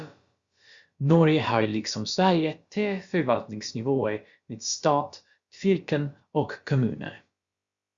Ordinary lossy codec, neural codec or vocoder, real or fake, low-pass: Opus, 64 kbps; codec, 16 kHz, about 1 kbps, DyCAST, with the encoder's durations; fake; 7.2 kHz